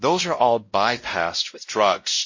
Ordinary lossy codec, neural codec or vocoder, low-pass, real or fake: MP3, 32 kbps; codec, 16 kHz, 0.5 kbps, X-Codec, WavLM features, trained on Multilingual LibriSpeech; 7.2 kHz; fake